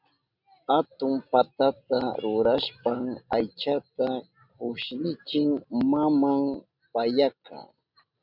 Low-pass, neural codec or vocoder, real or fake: 5.4 kHz; none; real